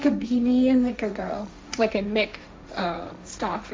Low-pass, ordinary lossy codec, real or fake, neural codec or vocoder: none; none; fake; codec, 16 kHz, 1.1 kbps, Voila-Tokenizer